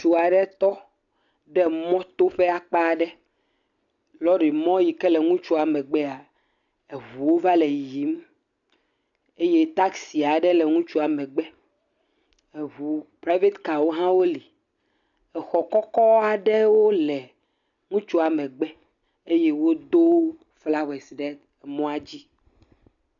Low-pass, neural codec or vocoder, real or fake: 7.2 kHz; none; real